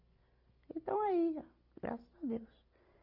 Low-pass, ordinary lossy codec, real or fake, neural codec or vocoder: 5.4 kHz; none; real; none